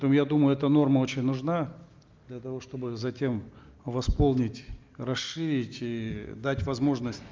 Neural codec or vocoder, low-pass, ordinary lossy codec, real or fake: none; 7.2 kHz; Opus, 32 kbps; real